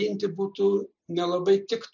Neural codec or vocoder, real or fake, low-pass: none; real; 7.2 kHz